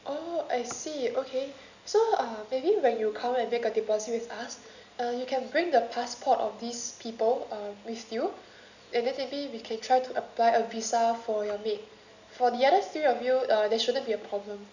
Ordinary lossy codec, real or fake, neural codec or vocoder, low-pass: none; real; none; 7.2 kHz